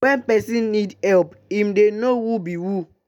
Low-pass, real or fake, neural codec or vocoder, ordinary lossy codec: 19.8 kHz; real; none; none